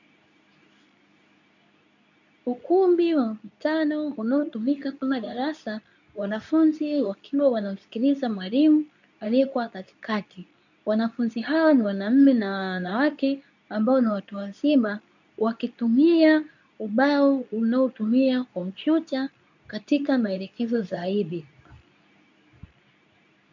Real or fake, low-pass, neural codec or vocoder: fake; 7.2 kHz; codec, 24 kHz, 0.9 kbps, WavTokenizer, medium speech release version 2